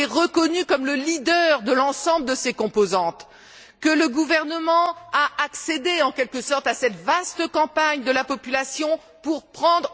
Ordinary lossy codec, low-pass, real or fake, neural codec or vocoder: none; none; real; none